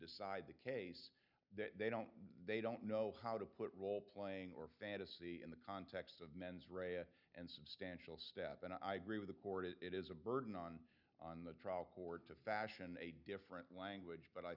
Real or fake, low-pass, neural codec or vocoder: real; 5.4 kHz; none